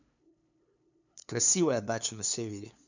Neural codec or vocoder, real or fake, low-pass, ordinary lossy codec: codec, 16 kHz, 2 kbps, FunCodec, trained on LibriTTS, 25 frames a second; fake; 7.2 kHz; none